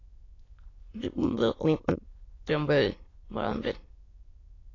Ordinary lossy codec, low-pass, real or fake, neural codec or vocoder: AAC, 32 kbps; 7.2 kHz; fake; autoencoder, 22.05 kHz, a latent of 192 numbers a frame, VITS, trained on many speakers